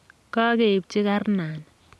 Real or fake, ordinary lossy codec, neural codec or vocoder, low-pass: real; none; none; none